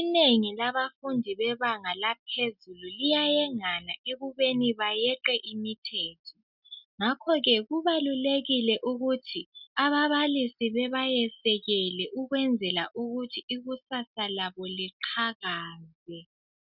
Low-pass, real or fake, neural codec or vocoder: 5.4 kHz; real; none